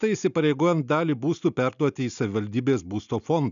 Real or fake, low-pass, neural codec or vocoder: real; 7.2 kHz; none